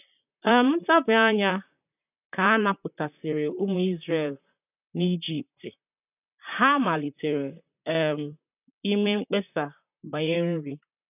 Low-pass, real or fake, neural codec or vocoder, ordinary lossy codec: 3.6 kHz; fake; vocoder, 44.1 kHz, 128 mel bands, Pupu-Vocoder; none